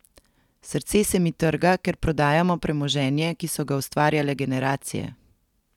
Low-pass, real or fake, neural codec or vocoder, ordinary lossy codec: 19.8 kHz; real; none; none